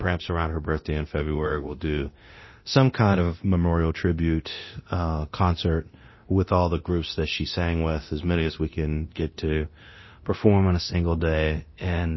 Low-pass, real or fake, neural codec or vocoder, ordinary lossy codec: 7.2 kHz; fake; codec, 24 kHz, 0.9 kbps, DualCodec; MP3, 24 kbps